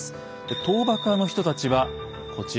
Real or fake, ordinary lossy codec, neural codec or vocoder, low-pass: real; none; none; none